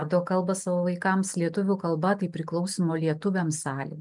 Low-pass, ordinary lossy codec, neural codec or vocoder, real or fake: 10.8 kHz; MP3, 96 kbps; none; real